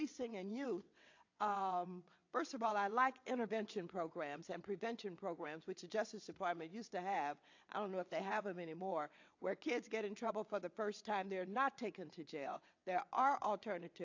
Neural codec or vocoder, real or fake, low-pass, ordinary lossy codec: vocoder, 22.05 kHz, 80 mel bands, WaveNeXt; fake; 7.2 kHz; AAC, 48 kbps